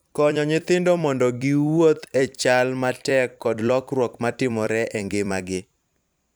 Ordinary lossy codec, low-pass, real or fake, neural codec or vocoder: none; none; fake; vocoder, 44.1 kHz, 128 mel bands every 256 samples, BigVGAN v2